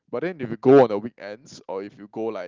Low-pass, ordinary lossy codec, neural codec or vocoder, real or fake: 7.2 kHz; Opus, 32 kbps; none; real